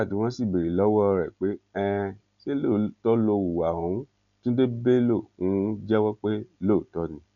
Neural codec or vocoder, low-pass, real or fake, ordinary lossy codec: none; 7.2 kHz; real; none